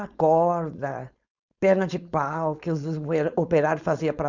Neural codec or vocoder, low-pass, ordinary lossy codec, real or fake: codec, 16 kHz, 4.8 kbps, FACodec; 7.2 kHz; Opus, 64 kbps; fake